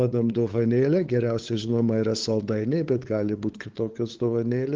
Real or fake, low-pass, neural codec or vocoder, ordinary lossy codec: fake; 7.2 kHz; codec, 16 kHz, 8 kbps, FunCodec, trained on LibriTTS, 25 frames a second; Opus, 16 kbps